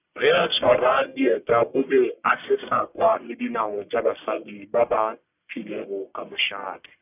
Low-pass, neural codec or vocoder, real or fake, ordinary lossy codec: 3.6 kHz; codec, 44.1 kHz, 1.7 kbps, Pupu-Codec; fake; none